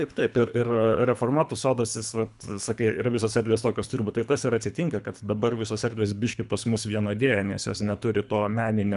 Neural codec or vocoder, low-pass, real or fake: codec, 24 kHz, 3 kbps, HILCodec; 10.8 kHz; fake